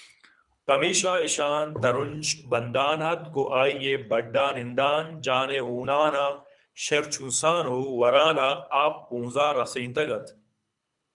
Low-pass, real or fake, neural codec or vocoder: 10.8 kHz; fake; codec, 24 kHz, 3 kbps, HILCodec